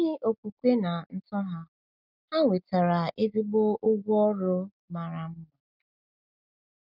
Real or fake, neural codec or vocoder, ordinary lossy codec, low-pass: real; none; none; 5.4 kHz